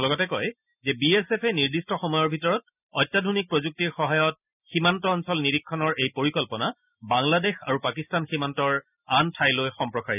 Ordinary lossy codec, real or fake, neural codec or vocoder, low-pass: none; real; none; 3.6 kHz